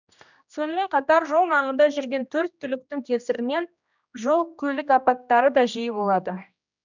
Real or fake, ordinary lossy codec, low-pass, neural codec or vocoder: fake; none; 7.2 kHz; codec, 16 kHz, 1 kbps, X-Codec, HuBERT features, trained on general audio